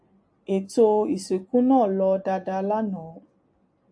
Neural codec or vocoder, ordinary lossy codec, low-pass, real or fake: none; AAC, 64 kbps; 9.9 kHz; real